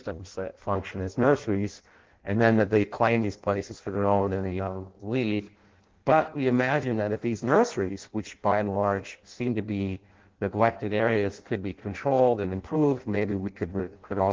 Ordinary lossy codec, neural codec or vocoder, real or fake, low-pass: Opus, 16 kbps; codec, 16 kHz in and 24 kHz out, 0.6 kbps, FireRedTTS-2 codec; fake; 7.2 kHz